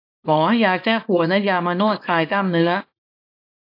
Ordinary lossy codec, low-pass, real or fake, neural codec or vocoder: AAC, 32 kbps; 5.4 kHz; fake; codec, 24 kHz, 0.9 kbps, WavTokenizer, small release